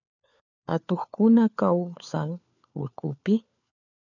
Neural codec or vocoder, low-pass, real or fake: codec, 16 kHz, 4 kbps, FunCodec, trained on LibriTTS, 50 frames a second; 7.2 kHz; fake